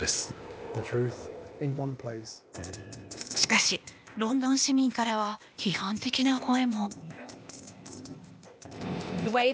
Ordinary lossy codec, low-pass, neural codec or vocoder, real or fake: none; none; codec, 16 kHz, 0.8 kbps, ZipCodec; fake